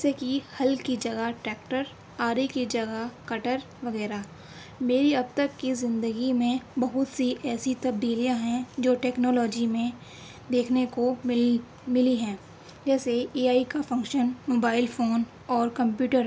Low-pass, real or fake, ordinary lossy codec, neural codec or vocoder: none; real; none; none